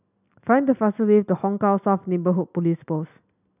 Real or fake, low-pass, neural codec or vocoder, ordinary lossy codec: real; 3.6 kHz; none; none